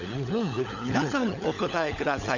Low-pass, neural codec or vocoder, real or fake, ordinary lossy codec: 7.2 kHz; codec, 16 kHz, 16 kbps, FunCodec, trained on LibriTTS, 50 frames a second; fake; none